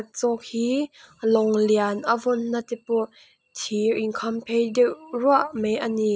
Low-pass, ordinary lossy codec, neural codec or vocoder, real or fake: none; none; none; real